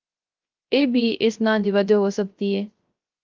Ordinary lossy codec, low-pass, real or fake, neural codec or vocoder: Opus, 32 kbps; 7.2 kHz; fake; codec, 16 kHz, 0.3 kbps, FocalCodec